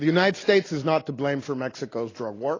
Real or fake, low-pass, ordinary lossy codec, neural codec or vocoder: real; 7.2 kHz; AAC, 32 kbps; none